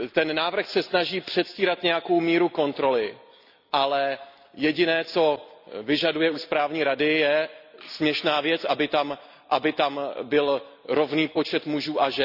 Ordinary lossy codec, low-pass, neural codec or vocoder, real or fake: none; 5.4 kHz; none; real